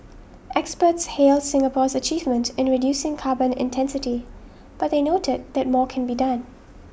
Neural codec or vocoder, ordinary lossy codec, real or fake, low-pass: none; none; real; none